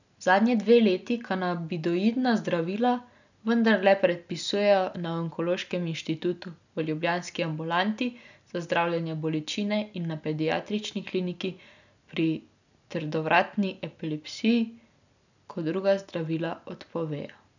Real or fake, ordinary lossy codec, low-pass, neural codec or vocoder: real; none; 7.2 kHz; none